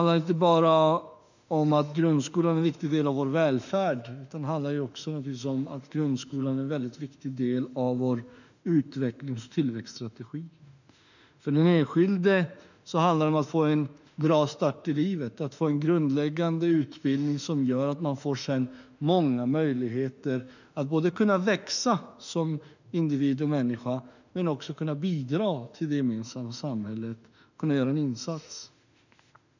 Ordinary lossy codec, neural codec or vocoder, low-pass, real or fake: none; autoencoder, 48 kHz, 32 numbers a frame, DAC-VAE, trained on Japanese speech; 7.2 kHz; fake